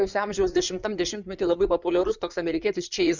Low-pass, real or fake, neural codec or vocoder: 7.2 kHz; fake; codec, 16 kHz in and 24 kHz out, 2.2 kbps, FireRedTTS-2 codec